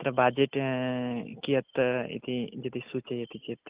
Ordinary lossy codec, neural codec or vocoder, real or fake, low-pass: Opus, 32 kbps; none; real; 3.6 kHz